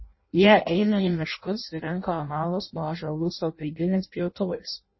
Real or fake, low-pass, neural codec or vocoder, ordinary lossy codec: fake; 7.2 kHz; codec, 16 kHz in and 24 kHz out, 0.6 kbps, FireRedTTS-2 codec; MP3, 24 kbps